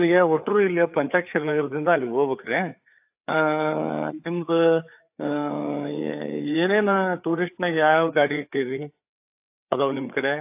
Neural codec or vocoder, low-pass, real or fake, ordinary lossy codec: codec, 16 kHz, 4 kbps, FreqCodec, larger model; 3.6 kHz; fake; AAC, 32 kbps